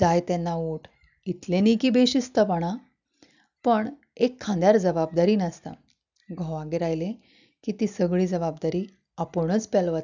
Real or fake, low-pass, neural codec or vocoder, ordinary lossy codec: real; 7.2 kHz; none; none